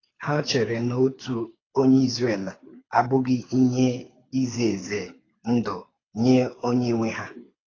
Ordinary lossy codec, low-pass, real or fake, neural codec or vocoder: AAC, 32 kbps; 7.2 kHz; fake; codec, 24 kHz, 6 kbps, HILCodec